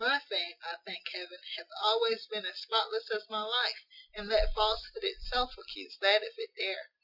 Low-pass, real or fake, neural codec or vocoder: 5.4 kHz; real; none